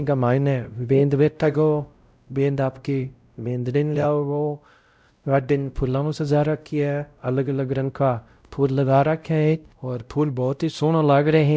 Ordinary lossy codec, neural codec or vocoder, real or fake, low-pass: none; codec, 16 kHz, 0.5 kbps, X-Codec, WavLM features, trained on Multilingual LibriSpeech; fake; none